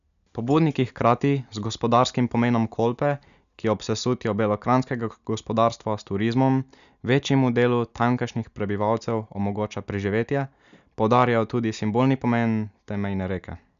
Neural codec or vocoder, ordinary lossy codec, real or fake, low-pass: none; none; real; 7.2 kHz